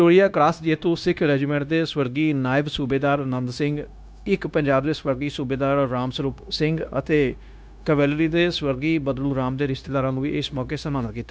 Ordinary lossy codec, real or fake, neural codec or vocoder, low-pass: none; fake; codec, 16 kHz, 0.9 kbps, LongCat-Audio-Codec; none